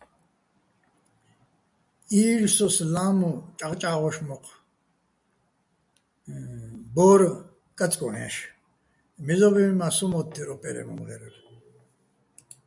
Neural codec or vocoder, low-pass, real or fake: none; 10.8 kHz; real